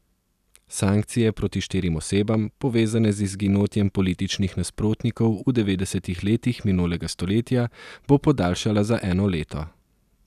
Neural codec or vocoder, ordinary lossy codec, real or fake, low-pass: none; none; real; 14.4 kHz